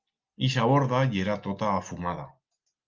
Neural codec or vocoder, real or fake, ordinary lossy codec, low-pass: none; real; Opus, 32 kbps; 7.2 kHz